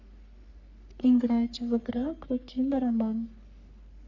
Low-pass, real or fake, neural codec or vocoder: 7.2 kHz; fake; codec, 44.1 kHz, 3.4 kbps, Pupu-Codec